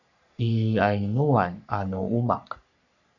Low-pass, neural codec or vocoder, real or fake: 7.2 kHz; codec, 44.1 kHz, 3.4 kbps, Pupu-Codec; fake